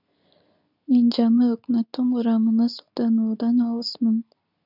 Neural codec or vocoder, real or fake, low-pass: codec, 24 kHz, 0.9 kbps, WavTokenizer, medium speech release version 2; fake; 5.4 kHz